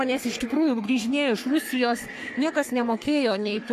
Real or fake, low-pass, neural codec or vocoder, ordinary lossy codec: fake; 14.4 kHz; codec, 44.1 kHz, 3.4 kbps, Pupu-Codec; AAC, 64 kbps